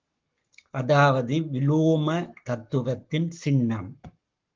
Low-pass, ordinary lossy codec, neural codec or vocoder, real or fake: 7.2 kHz; Opus, 32 kbps; codec, 44.1 kHz, 7.8 kbps, Pupu-Codec; fake